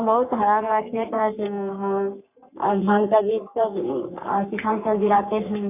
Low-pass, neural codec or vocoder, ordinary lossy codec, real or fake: 3.6 kHz; codec, 44.1 kHz, 3.4 kbps, Pupu-Codec; none; fake